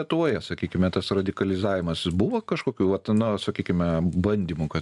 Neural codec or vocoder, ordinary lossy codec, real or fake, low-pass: none; MP3, 96 kbps; real; 10.8 kHz